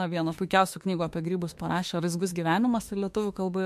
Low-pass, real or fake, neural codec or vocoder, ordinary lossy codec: 14.4 kHz; fake; autoencoder, 48 kHz, 32 numbers a frame, DAC-VAE, trained on Japanese speech; MP3, 64 kbps